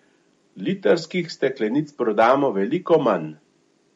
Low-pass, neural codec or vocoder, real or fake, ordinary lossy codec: 19.8 kHz; none; real; MP3, 48 kbps